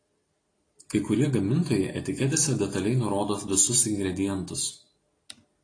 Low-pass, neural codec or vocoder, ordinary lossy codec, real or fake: 9.9 kHz; none; AAC, 32 kbps; real